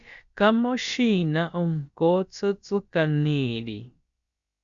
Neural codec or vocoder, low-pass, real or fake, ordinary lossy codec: codec, 16 kHz, about 1 kbps, DyCAST, with the encoder's durations; 7.2 kHz; fake; Opus, 64 kbps